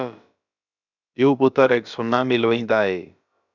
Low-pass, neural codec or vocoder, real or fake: 7.2 kHz; codec, 16 kHz, about 1 kbps, DyCAST, with the encoder's durations; fake